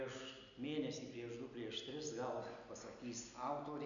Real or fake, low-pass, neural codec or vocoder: real; 7.2 kHz; none